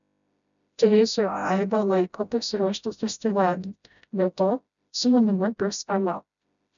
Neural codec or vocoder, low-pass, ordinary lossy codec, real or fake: codec, 16 kHz, 0.5 kbps, FreqCodec, smaller model; 7.2 kHz; MP3, 96 kbps; fake